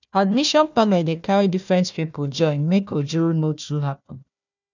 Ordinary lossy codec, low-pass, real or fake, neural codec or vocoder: none; 7.2 kHz; fake; codec, 16 kHz, 1 kbps, FunCodec, trained on Chinese and English, 50 frames a second